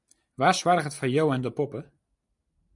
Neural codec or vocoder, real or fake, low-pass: none; real; 10.8 kHz